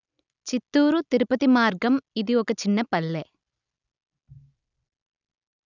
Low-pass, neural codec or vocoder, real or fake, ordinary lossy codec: 7.2 kHz; none; real; none